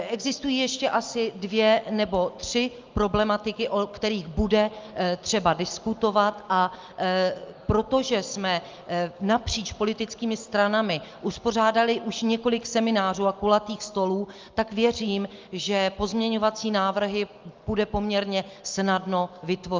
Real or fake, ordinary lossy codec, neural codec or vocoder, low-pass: real; Opus, 24 kbps; none; 7.2 kHz